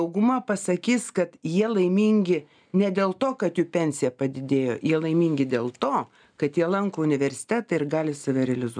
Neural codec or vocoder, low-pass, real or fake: none; 9.9 kHz; real